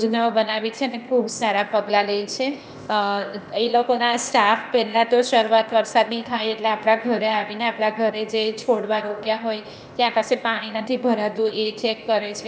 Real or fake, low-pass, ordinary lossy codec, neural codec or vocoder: fake; none; none; codec, 16 kHz, 0.8 kbps, ZipCodec